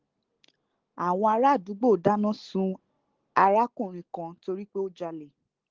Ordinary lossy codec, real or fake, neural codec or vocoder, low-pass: Opus, 16 kbps; real; none; 7.2 kHz